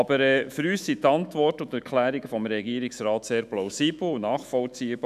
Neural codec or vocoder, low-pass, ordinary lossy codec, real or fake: autoencoder, 48 kHz, 128 numbers a frame, DAC-VAE, trained on Japanese speech; 14.4 kHz; none; fake